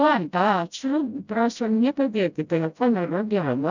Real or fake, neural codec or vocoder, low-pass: fake; codec, 16 kHz, 0.5 kbps, FreqCodec, smaller model; 7.2 kHz